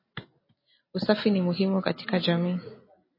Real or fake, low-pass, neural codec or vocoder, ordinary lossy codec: real; 5.4 kHz; none; MP3, 24 kbps